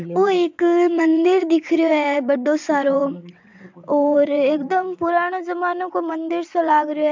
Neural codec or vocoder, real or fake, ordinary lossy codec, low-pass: vocoder, 22.05 kHz, 80 mel bands, WaveNeXt; fake; MP3, 64 kbps; 7.2 kHz